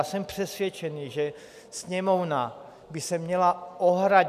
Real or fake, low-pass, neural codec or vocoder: real; 14.4 kHz; none